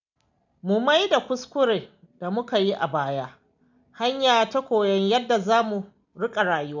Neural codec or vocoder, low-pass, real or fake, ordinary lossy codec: none; 7.2 kHz; real; none